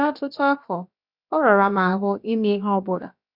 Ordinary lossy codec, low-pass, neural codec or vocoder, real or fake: none; 5.4 kHz; codec, 16 kHz, about 1 kbps, DyCAST, with the encoder's durations; fake